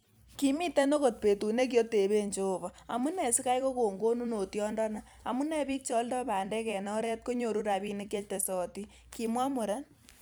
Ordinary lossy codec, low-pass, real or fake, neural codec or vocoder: none; none; fake; vocoder, 44.1 kHz, 128 mel bands every 256 samples, BigVGAN v2